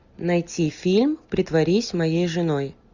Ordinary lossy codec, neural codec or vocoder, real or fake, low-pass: Opus, 64 kbps; none; real; 7.2 kHz